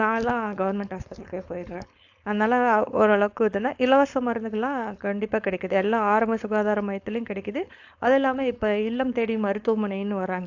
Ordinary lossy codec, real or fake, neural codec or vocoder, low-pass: AAC, 48 kbps; fake; codec, 16 kHz, 4.8 kbps, FACodec; 7.2 kHz